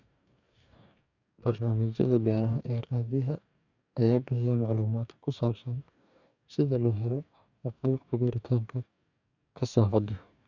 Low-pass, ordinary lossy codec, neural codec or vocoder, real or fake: 7.2 kHz; none; codec, 44.1 kHz, 2.6 kbps, DAC; fake